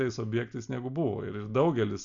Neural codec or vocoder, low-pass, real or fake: none; 7.2 kHz; real